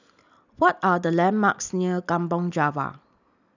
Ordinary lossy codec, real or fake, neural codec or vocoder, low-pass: none; real; none; 7.2 kHz